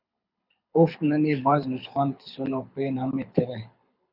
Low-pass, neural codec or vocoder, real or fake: 5.4 kHz; codec, 24 kHz, 6 kbps, HILCodec; fake